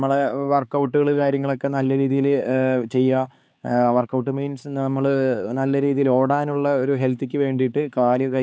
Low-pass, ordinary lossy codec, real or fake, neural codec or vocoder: none; none; fake; codec, 16 kHz, 4 kbps, X-Codec, HuBERT features, trained on LibriSpeech